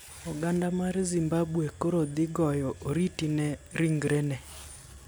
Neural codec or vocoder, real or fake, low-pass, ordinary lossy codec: none; real; none; none